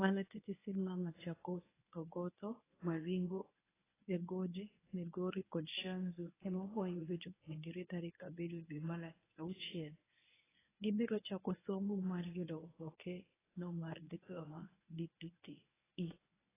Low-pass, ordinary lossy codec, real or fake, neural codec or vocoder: 3.6 kHz; AAC, 16 kbps; fake; codec, 24 kHz, 0.9 kbps, WavTokenizer, medium speech release version 1